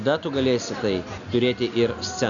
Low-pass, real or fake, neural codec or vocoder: 7.2 kHz; real; none